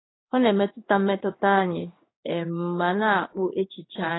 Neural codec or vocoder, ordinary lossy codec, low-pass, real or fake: codec, 16 kHz in and 24 kHz out, 1 kbps, XY-Tokenizer; AAC, 16 kbps; 7.2 kHz; fake